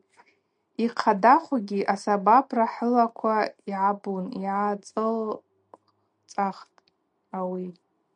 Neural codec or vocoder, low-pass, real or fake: none; 9.9 kHz; real